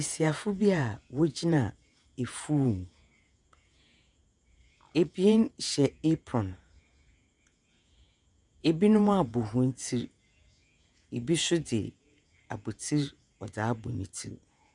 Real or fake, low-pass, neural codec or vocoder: fake; 10.8 kHz; vocoder, 48 kHz, 128 mel bands, Vocos